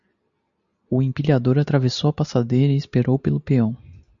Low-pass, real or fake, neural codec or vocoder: 7.2 kHz; real; none